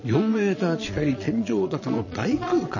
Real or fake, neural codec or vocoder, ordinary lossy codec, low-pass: fake; vocoder, 22.05 kHz, 80 mel bands, Vocos; MP3, 32 kbps; 7.2 kHz